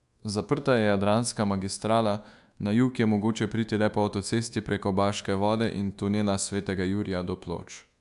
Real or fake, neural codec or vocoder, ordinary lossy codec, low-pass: fake; codec, 24 kHz, 1.2 kbps, DualCodec; AAC, 96 kbps; 10.8 kHz